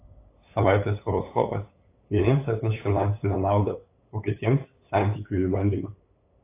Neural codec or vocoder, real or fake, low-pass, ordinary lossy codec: codec, 16 kHz, 8 kbps, FunCodec, trained on LibriTTS, 25 frames a second; fake; 3.6 kHz; AAC, 24 kbps